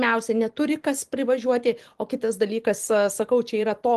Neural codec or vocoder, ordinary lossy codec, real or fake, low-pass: vocoder, 44.1 kHz, 128 mel bands every 256 samples, BigVGAN v2; Opus, 24 kbps; fake; 14.4 kHz